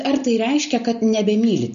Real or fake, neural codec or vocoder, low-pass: real; none; 7.2 kHz